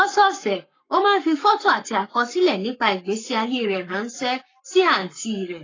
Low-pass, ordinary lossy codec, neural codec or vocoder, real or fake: 7.2 kHz; AAC, 32 kbps; codec, 44.1 kHz, 7.8 kbps, Pupu-Codec; fake